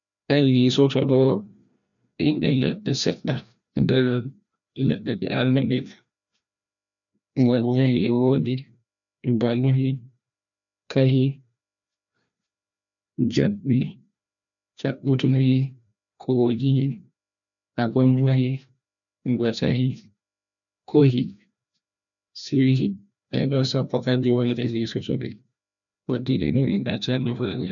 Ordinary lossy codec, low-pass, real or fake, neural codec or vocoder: none; 7.2 kHz; fake; codec, 16 kHz, 1 kbps, FreqCodec, larger model